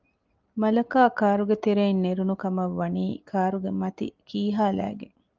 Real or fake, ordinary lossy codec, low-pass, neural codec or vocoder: real; Opus, 24 kbps; 7.2 kHz; none